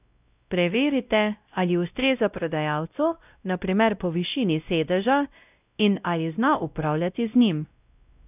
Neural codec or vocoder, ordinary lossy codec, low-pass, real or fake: codec, 16 kHz, 0.5 kbps, X-Codec, WavLM features, trained on Multilingual LibriSpeech; none; 3.6 kHz; fake